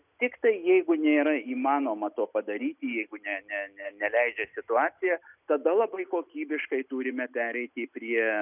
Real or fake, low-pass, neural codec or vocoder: real; 3.6 kHz; none